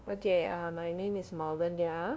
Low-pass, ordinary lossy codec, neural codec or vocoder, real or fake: none; none; codec, 16 kHz, 0.5 kbps, FunCodec, trained on LibriTTS, 25 frames a second; fake